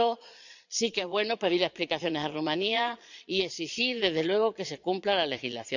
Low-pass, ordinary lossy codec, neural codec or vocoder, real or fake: 7.2 kHz; none; vocoder, 22.05 kHz, 80 mel bands, Vocos; fake